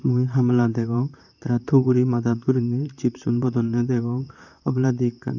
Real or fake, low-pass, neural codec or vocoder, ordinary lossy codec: fake; 7.2 kHz; vocoder, 44.1 kHz, 128 mel bands, Pupu-Vocoder; none